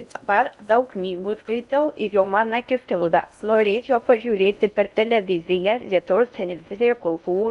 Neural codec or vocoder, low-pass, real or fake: codec, 16 kHz in and 24 kHz out, 0.6 kbps, FocalCodec, streaming, 4096 codes; 10.8 kHz; fake